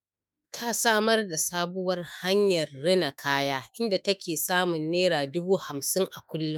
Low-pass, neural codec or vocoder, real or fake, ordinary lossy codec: none; autoencoder, 48 kHz, 32 numbers a frame, DAC-VAE, trained on Japanese speech; fake; none